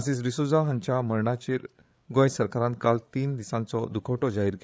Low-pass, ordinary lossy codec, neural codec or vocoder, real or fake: none; none; codec, 16 kHz, 8 kbps, FreqCodec, larger model; fake